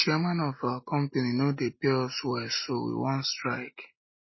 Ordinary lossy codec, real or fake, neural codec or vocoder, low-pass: MP3, 24 kbps; real; none; 7.2 kHz